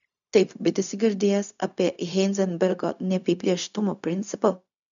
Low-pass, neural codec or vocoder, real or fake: 7.2 kHz; codec, 16 kHz, 0.4 kbps, LongCat-Audio-Codec; fake